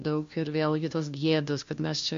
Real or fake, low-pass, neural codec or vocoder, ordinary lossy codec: fake; 7.2 kHz; codec, 16 kHz, 1 kbps, FunCodec, trained on LibriTTS, 50 frames a second; MP3, 48 kbps